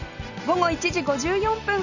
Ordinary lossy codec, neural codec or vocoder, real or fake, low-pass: none; none; real; 7.2 kHz